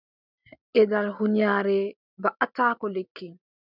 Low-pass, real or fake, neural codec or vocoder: 5.4 kHz; real; none